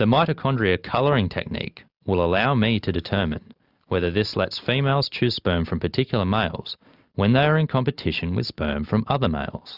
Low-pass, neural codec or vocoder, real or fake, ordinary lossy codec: 5.4 kHz; none; real; Opus, 64 kbps